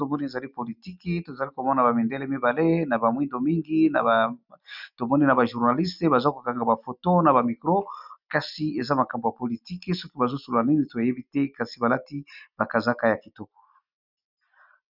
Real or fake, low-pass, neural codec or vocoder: real; 5.4 kHz; none